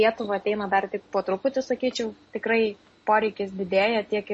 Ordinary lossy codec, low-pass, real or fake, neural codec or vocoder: MP3, 32 kbps; 10.8 kHz; real; none